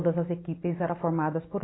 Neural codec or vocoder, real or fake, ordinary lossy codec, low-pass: none; real; AAC, 16 kbps; 7.2 kHz